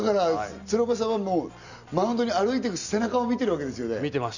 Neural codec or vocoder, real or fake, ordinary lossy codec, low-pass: none; real; none; 7.2 kHz